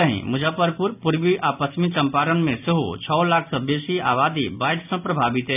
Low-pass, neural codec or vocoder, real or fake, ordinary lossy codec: 3.6 kHz; none; real; none